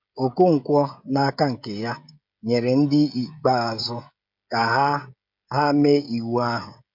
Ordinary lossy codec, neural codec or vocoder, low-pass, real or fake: MP3, 48 kbps; codec, 16 kHz, 16 kbps, FreqCodec, smaller model; 5.4 kHz; fake